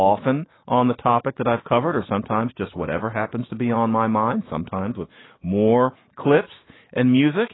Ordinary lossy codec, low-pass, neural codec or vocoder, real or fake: AAC, 16 kbps; 7.2 kHz; autoencoder, 48 kHz, 128 numbers a frame, DAC-VAE, trained on Japanese speech; fake